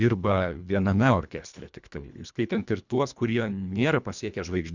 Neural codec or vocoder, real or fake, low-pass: codec, 24 kHz, 1.5 kbps, HILCodec; fake; 7.2 kHz